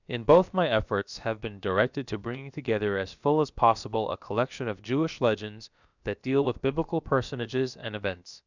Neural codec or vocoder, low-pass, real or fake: codec, 16 kHz, about 1 kbps, DyCAST, with the encoder's durations; 7.2 kHz; fake